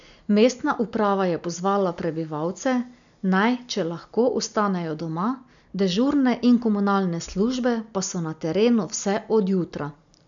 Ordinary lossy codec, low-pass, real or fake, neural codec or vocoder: none; 7.2 kHz; real; none